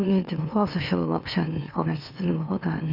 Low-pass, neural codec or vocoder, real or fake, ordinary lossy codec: 5.4 kHz; autoencoder, 44.1 kHz, a latent of 192 numbers a frame, MeloTTS; fake; none